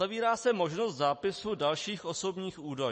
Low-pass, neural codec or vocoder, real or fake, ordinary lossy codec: 10.8 kHz; none; real; MP3, 32 kbps